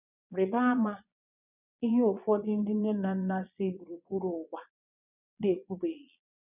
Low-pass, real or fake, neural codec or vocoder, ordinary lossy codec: 3.6 kHz; fake; vocoder, 22.05 kHz, 80 mel bands, Vocos; none